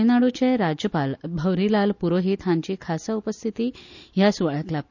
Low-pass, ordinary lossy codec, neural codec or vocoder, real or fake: 7.2 kHz; none; none; real